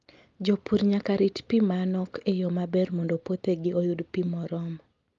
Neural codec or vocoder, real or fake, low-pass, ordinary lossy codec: none; real; 7.2 kHz; Opus, 32 kbps